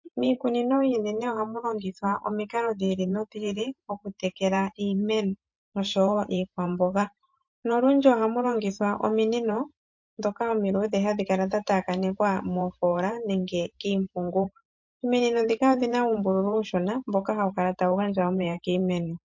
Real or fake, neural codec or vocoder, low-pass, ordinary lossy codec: fake; vocoder, 44.1 kHz, 128 mel bands every 512 samples, BigVGAN v2; 7.2 kHz; MP3, 48 kbps